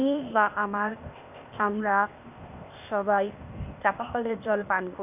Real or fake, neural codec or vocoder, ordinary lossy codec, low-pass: fake; codec, 16 kHz, 0.8 kbps, ZipCodec; none; 3.6 kHz